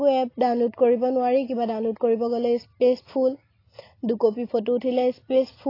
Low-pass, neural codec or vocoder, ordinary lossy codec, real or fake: 5.4 kHz; none; AAC, 24 kbps; real